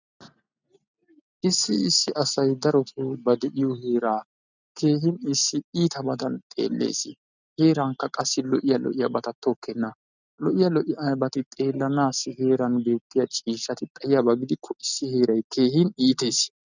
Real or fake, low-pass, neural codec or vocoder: real; 7.2 kHz; none